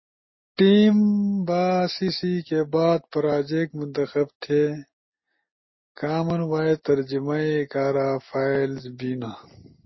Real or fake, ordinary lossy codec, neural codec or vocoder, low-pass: real; MP3, 24 kbps; none; 7.2 kHz